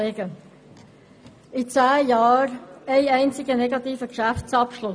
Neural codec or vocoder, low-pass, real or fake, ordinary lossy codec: none; none; real; none